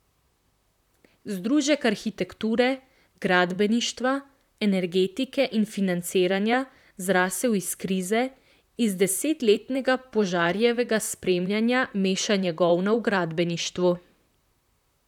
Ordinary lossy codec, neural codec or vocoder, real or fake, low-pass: none; vocoder, 44.1 kHz, 128 mel bands, Pupu-Vocoder; fake; 19.8 kHz